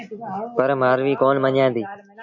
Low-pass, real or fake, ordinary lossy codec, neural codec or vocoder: 7.2 kHz; real; AAC, 48 kbps; none